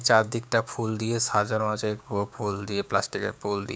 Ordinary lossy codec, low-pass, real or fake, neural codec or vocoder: none; none; fake; codec, 16 kHz, 6 kbps, DAC